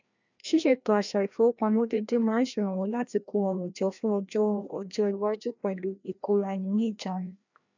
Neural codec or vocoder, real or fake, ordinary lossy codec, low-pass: codec, 16 kHz, 1 kbps, FreqCodec, larger model; fake; none; 7.2 kHz